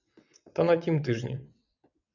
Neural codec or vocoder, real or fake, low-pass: vocoder, 22.05 kHz, 80 mel bands, WaveNeXt; fake; 7.2 kHz